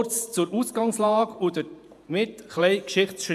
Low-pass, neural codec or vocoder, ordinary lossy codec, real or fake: 14.4 kHz; vocoder, 48 kHz, 128 mel bands, Vocos; AAC, 96 kbps; fake